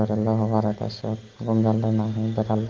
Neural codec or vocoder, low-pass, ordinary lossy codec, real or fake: none; 7.2 kHz; Opus, 16 kbps; real